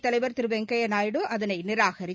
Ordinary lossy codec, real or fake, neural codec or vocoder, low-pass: none; real; none; none